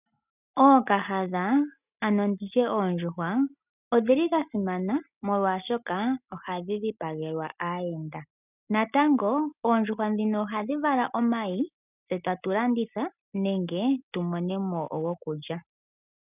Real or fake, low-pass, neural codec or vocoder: real; 3.6 kHz; none